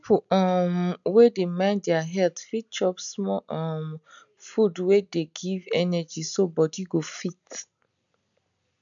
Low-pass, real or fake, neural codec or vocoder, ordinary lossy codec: 7.2 kHz; real; none; none